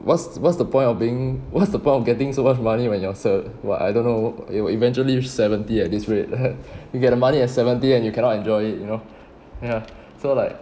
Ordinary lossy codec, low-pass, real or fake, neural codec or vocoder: none; none; real; none